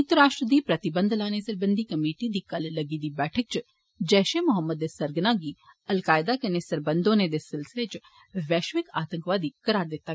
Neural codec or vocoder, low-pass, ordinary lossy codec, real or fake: none; none; none; real